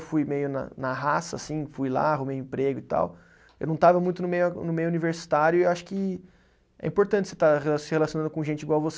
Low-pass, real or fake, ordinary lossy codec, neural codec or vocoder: none; real; none; none